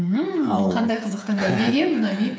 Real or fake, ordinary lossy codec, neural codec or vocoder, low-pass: fake; none; codec, 16 kHz, 8 kbps, FreqCodec, smaller model; none